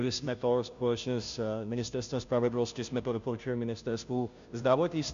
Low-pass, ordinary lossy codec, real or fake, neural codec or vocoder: 7.2 kHz; MP3, 64 kbps; fake; codec, 16 kHz, 0.5 kbps, FunCodec, trained on Chinese and English, 25 frames a second